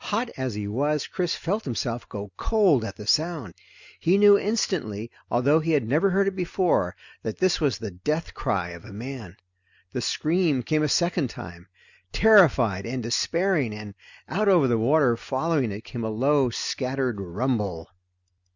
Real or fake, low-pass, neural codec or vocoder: real; 7.2 kHz; none